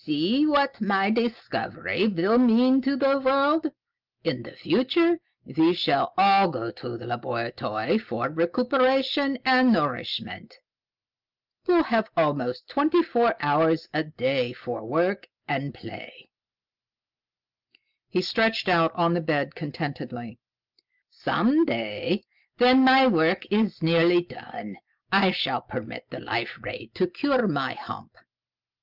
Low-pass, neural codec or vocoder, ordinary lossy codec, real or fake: 5.4 kHz; none; Opus, 32 kbps; real